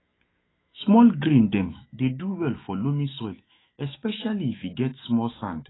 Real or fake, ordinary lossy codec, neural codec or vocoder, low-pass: fake; AAC, 16 kbps; autoencoder, 48 kHz, 128 numbers a frame, DAC-VAE, trained on Japanese speech; 7.2 kHz